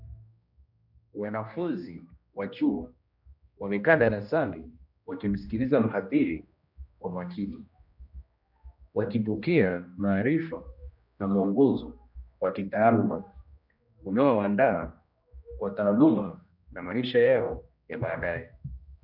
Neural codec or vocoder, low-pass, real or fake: codec, 16 kHz, 1 kbps, X-Codec, HuBERT features, trained on general audio; 5.4 kHz; fake